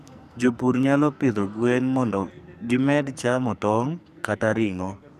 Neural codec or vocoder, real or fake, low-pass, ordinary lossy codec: codec, 44.1 kHz, 2.6 kbps, SNAC; fake; 14.4 kHz; none